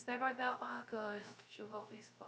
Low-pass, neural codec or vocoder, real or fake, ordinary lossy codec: none; codec, 16 kHz, 0.3 kbps, FocalCodec; fake; none